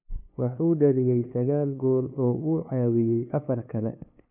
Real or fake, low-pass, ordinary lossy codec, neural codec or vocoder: fake; 3.6 kHz; none; codec, 16 kHz, 2 kbps, FunCodec, trained on LibriTTS, 25 frames a second